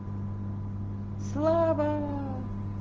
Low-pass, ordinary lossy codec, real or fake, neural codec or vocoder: 7.2 kHz; Opus, 16 kbps; real; none